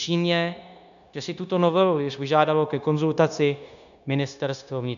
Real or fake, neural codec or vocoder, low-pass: fake; codec, 16 kHz, 0.9 kbps, LongCat-Audio-Codec; 7.2 kHz